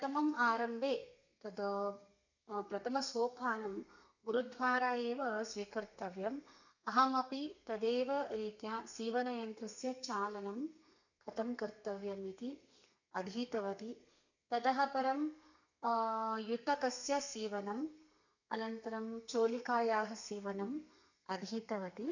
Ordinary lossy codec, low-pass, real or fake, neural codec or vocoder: none; 7.2 kHz; fake; codec, 32 kHz, 1.9 kbps, SNAC